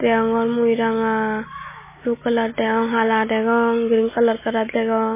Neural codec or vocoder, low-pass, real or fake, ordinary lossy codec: none; 3.6 kHz; real; MP3, 16 kbps